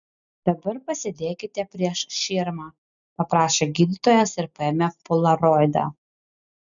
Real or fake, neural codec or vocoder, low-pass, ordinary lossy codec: real; none; 7.2 kHz; MP3, 96 kbps